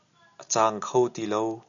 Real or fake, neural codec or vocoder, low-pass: real; none; 7.2 kHz